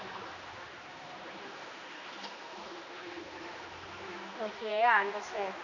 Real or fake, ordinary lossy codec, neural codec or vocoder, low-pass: fake; none; codec, 16 kHz, 2 kbps, X-Codec, HuBERT features, trained on general audio; 7.2 kHz